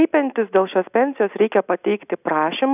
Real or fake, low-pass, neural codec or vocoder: real; 3.6 kHz; none